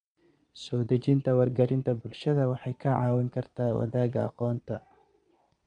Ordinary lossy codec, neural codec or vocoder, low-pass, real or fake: MP3, 64 kbps; vocoder, 22.05 kHz, 80 mel bands, Vocos; 9.9 kHz; fake